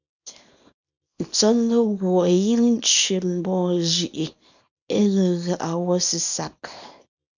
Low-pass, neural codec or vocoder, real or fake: 7.2 kHz; codec, 24 kHz, 0.9 kbps, WavTokenizer, small release; fake